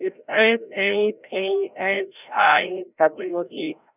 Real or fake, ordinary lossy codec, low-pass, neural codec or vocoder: fake; none; 3.6 kHz; codec, 16 kHz, 0.5 kbps, FreqCodec, larger model